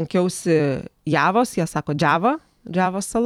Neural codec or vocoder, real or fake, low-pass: vocoder, 44.1 kHz, 128 mel bands every 256 samples, BigVGAN v2; fake; 19.8 kHz